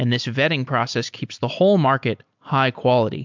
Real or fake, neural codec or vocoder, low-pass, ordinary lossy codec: real; none; 7.2 kHz; MP3, 64 kbps